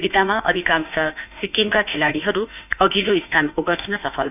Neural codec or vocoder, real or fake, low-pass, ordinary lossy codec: autoencoder, 48 kHz, 32 numbers a frame, DAC-VAE, trained on Japanese speech; fake; 3.6 kHz; none